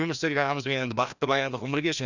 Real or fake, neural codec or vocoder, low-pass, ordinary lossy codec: fake; codec, 16 kHz, 1 kbps, FreqCodec, larger model; 7.2 kHz; none